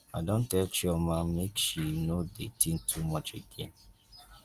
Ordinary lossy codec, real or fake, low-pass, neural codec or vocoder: Opus, 24 kbps; real; 14.4 kHz; none